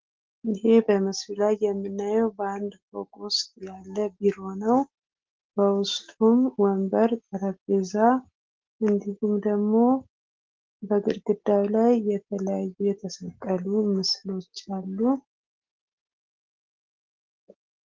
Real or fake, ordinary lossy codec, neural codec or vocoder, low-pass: real; Opus, 16 kbps; none; 7.2 kHz